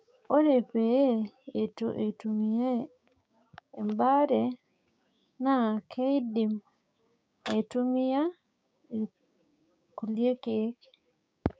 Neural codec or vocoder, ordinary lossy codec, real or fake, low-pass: codec, 16 kHz, 6 kbps, DAC; none; fake; none